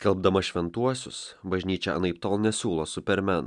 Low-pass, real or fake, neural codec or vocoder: 10.8 kHz; real; none